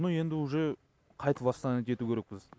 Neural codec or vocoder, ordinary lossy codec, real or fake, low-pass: none; none; real; none